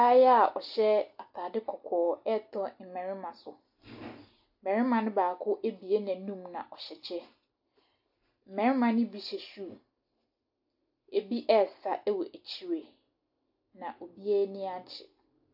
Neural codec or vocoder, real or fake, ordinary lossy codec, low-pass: none; real; AAC, 48 kbps; 5.4 kHz